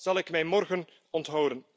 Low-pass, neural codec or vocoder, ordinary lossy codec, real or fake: none; none; none; real